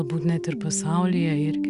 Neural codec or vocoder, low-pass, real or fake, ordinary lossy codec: none; 10.8 kHz; real; Opus, 64 kbps